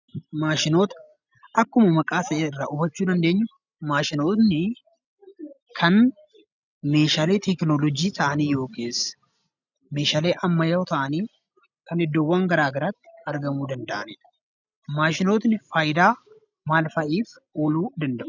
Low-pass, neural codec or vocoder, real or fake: 7.2 kHz; none; real